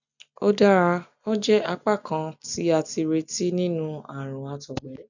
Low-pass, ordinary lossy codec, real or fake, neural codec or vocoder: 7.2 kHz; none; real; none